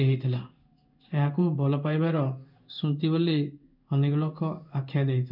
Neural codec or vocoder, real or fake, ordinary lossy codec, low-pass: codec, 16 kHz in and 24 kHz out, 1 kbps, XY-Tokenizer; fake; none; 5.4 kHz